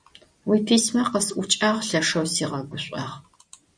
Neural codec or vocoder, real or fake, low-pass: none; real; 9.9 kHz